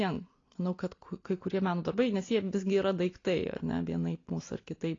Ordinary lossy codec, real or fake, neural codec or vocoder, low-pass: AAC, 32 kbps; real; none; 7.2 kHz